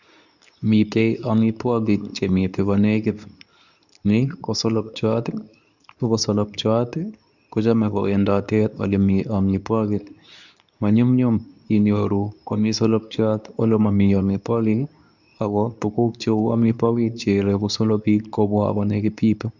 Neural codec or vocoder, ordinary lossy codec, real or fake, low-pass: codec, 24 kHz, 0.9 kbps, WavTokenizer, medium speech release version 2; none; fake; 7.2 kHz